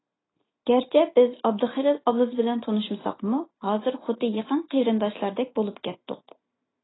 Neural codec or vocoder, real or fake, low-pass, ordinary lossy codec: none; real; 7.2 kHz; AAC, 16 kbps